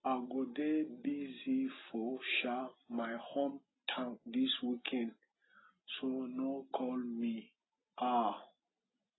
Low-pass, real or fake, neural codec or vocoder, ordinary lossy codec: 7.2 kHz; real; none; AAC, 16 kbps